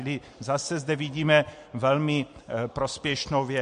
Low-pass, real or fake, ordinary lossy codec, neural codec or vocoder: 9.9 kHz; real; MP3, 48 kbps; none